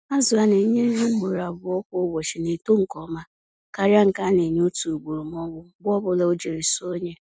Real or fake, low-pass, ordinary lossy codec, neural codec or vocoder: real; none; none; none